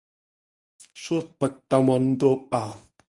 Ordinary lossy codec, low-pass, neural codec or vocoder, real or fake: Opus, 64 kbps; 10.8 kHz; codec, 24 kHz, 0.9 kbps, WavTokenizer, medium speech release version 1; fake